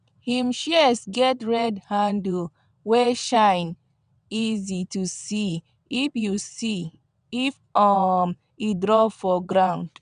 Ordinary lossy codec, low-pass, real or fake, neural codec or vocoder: none; 9.9 kHz; fake; vocoder, 22.05 kHz, 80 mel bands, WaveNeXt